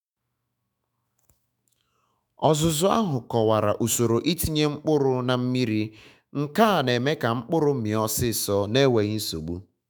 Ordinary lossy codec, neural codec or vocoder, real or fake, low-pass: none; autoencoder, 48 kHz, 128 numbers a frame, DAC-VAE, trained on Japanese speech; fake; none